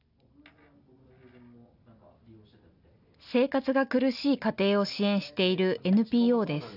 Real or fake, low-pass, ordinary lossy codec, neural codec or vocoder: real; 5.4 kHz; none; none